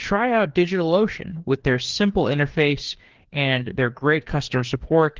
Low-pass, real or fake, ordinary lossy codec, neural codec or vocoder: 7.2 kHz; fake; Opus, 16 kbps; codec, 16 kHz, 2 kbps, FreqCodec, larger model